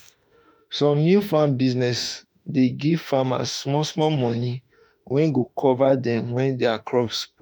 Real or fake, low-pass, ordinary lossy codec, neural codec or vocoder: fake; none; none; autoencoder, 48 kHz, 32 numbers a frame, DAC-VAE, trained on Japanese speech